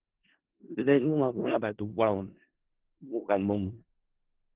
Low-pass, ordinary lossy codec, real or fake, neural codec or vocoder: 3.6 kHz; Opus, 16 kbps; fake; codec, 16 kHz in and 24 kHz out, 0.4 kbps, LongCat-Audio-Codec, four codebook decoder